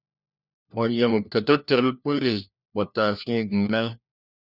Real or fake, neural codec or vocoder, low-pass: fake; codec, 16 kHz, 1 kbps, FunCodec, trained on LibriTTS, 50 frames a second; 5.4 kHz